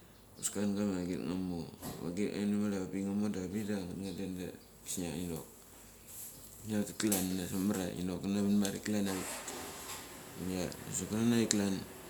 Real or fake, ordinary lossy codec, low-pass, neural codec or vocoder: real; none; none; none